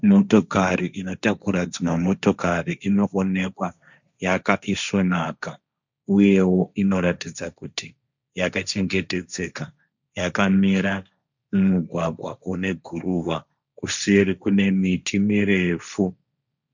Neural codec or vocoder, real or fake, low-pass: codec, 16 kHz, 1.1 kbps, Voila-Tokenizer; fake; 7.2 kHz